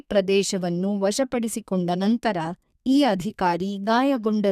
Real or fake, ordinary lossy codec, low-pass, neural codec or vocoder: fake; none; 14.4 kHz; codec, 32 kHz, 1.9 kbps, SNAC